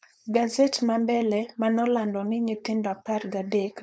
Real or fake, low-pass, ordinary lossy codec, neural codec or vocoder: fake; none; none; codec, 16 kHz, 4.8 kbps, FACodec